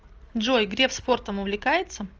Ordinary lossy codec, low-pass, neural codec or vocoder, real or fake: Opus, 24 kbps; 7.2 kHz; none; real